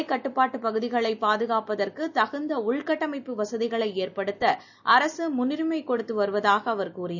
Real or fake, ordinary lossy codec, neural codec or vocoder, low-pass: real; none; none; 7.2 kHz